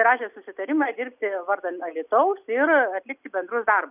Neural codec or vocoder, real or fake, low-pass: none; real; 3.6 kHz